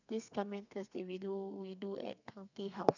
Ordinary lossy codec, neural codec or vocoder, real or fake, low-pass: none; codec, 32 kHz, 1.9 kbps, SNAC; fake; 7.2 kHz